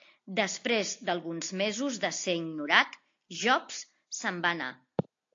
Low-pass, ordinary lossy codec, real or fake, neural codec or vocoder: 7.2 kHz; AAC, 48 kbps; real; none